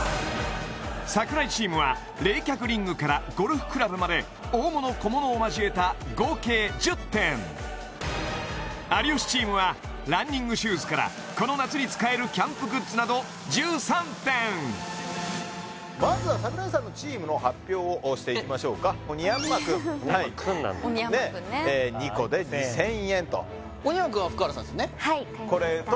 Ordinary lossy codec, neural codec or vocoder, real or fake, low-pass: none; none; real; none